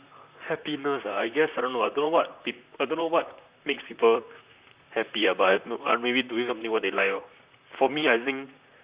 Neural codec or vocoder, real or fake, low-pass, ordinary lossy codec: vocoder, 44.1 kHz, 128 mel bands, Pupu-Vocoder; fake; 3.6 kHz; Opus, 64 kbps